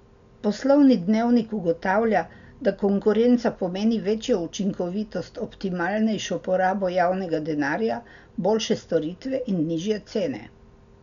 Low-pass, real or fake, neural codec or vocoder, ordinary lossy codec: 7.2 kHz; real; none; none